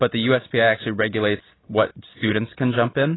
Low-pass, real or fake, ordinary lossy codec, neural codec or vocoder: 7.2 kHz; real; AAC, 16 kbps; none